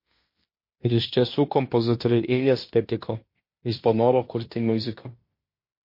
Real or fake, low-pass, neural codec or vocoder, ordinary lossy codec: fake; 5.4 kHz; codec, 16 kHz in and 24 kHz out, 0.9 kbps, LongCat-Audio-Codec, fine tuned four codebook decoder; MP3, 32 kbps